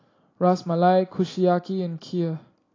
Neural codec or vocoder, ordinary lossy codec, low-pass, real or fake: none; AAC, 32 kbps; 7.2 kHz; real